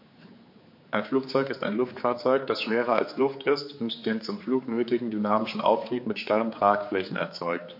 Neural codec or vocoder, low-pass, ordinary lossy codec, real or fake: codec, 16 kHz, 4 kbps, X-Codec, HuBERT features, trained on general audio; 5.4 kHz; MP3, 32 kbps; fake